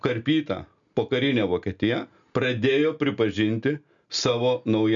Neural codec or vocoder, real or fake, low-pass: none; real; 7.2 kHz